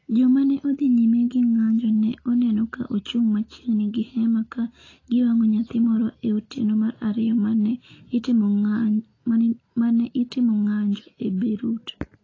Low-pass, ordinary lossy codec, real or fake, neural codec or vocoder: 7.2 kHz; AAC, 32 kbps; real; none